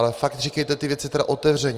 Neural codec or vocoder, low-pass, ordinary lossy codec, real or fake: none; 14.4 kHz; Opus, 32 kbps; real